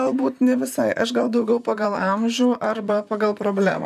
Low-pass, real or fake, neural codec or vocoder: 14.4 kHz; fake; vocoder, 44.1 kHz, 128 mel bands, Pupu-Vocoder